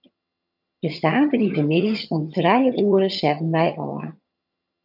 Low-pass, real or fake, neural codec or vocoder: 5.4 kHz; fake; vocoder, 22.05 kHz, 80 mel bands, HiFi-GAN